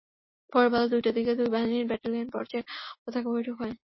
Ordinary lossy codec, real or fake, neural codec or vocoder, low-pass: MP3, 24 kbps; real; none; 7.2 kHz